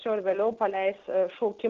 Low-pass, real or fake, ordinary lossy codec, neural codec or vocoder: 7.2 kHz; real; Opus, 16 kbps; none